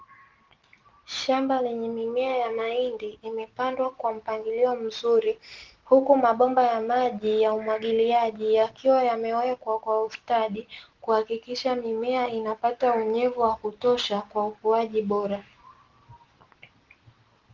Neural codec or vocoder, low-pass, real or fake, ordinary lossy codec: none; 7.2 kHz; real; Opus, 16 kbps